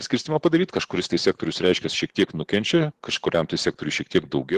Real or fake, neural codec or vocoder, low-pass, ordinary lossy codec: fake; vocoder, 44.1 kHz, 128 mel bands every 512 samples, BigVGAN v2; 14.4 kHz; Opus, 16 kbps